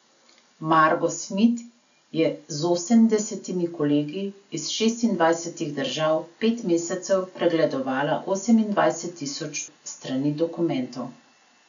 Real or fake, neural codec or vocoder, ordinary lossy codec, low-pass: real; none; none; 7.2 kHz